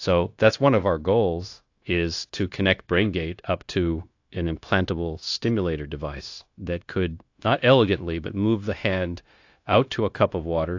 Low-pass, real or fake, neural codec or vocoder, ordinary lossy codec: 7.2 kHz; fake; codec, 16 kHz, 0.9 kbps, LongCat-Audio-Codec; AAC, 48 kbps